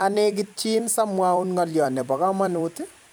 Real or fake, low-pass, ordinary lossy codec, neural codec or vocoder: fake; none; none; vocoder, 44.1 kHz, 128 mel bands every 256 samples, BigVGAN v2